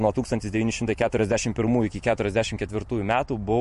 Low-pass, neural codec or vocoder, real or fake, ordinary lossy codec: 14.4 kHz; vocoder, 44.1 kHz, 128 mel bands every 512 samples, BigVGAN v2; fake; MP3, 48 kbps